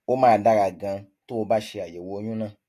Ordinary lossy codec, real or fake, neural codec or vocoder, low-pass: AAC, 48 kbps; real; none; 14.4 kHz